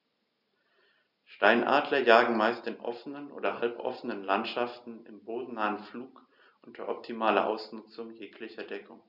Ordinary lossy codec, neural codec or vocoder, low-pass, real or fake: none; none; 5.4 kHz; real